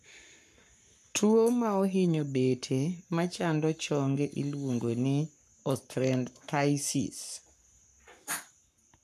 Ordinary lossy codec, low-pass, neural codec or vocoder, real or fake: none; 14.4 kHz; codec, 44.1 kHz, 7.8 kbps, Pupu-Codec; fake